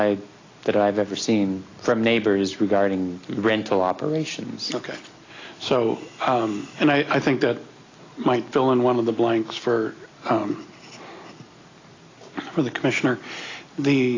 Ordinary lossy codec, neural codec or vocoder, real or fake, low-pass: AAC, 32 kbps; none; real; 7.2 kHz